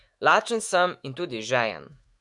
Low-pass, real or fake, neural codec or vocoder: 10.8 kHz; fake; autoencoder, 48 kHz, 128 numbers a frame, DAC-VAE, trained on Japanese speech